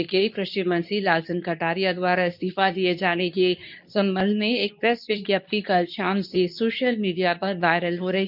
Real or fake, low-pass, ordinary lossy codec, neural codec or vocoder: fake; 5.4 kHz; none; codec, 24 kHz, 0.9 kbps, WavTokenizer, medium speech release version 2